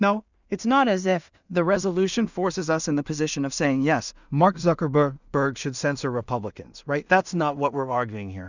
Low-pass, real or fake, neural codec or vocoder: 7.2 kHz; fake; codec, 16 kHz in and 24 kHz out, 0.4 kbps, LongCat-Audio-Codec, two codebook decoder